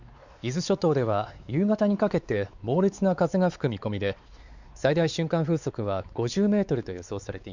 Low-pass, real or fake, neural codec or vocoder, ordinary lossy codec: 7.2 kHz; fake; codec, 16 kHz, 4 kbps, X-Codec, WavLM features, trained on Multilingual LibriSpeech; Opus, 64 kbps